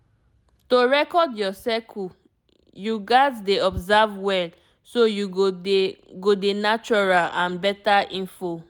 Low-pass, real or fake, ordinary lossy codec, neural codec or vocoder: none; real; none; none